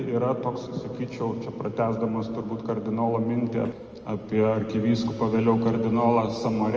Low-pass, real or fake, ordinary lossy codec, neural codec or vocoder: 7.2 kHz; real; Opus, 24 kbps; none